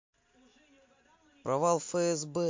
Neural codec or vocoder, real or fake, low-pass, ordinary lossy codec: none; real; 7.2 kHz; MP3, 48 kbps